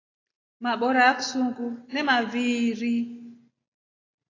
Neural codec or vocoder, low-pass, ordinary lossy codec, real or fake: none; 7.2 kHz; AAC, 32 kbps; real